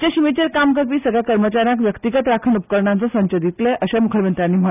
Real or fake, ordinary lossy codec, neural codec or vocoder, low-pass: real; none; none; 3.6 kHz